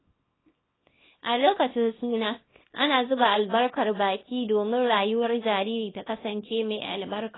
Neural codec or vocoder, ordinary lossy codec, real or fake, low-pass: codec, 24 kHz, 0.9 kbps, WavTokenizer, small release; AAC, 16 kbps; fake; 7.2 kHz